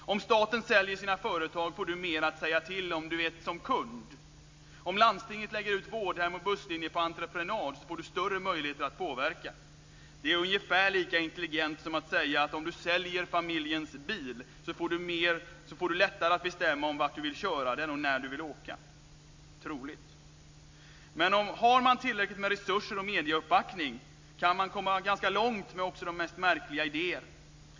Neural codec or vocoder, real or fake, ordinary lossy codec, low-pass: none; real; MP3, 48 kbps; 7.2 kHz